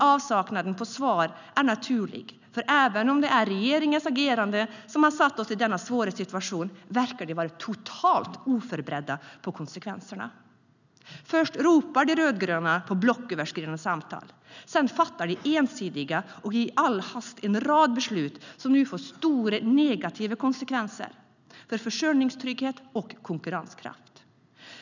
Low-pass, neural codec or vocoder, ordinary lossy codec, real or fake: 7.2 kHz; none; none; real